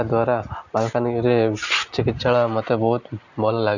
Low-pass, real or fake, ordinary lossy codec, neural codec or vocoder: 7.2 kHz; real; none; none